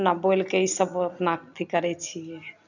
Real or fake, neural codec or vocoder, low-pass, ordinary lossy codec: real; none; 7.2 kHz; none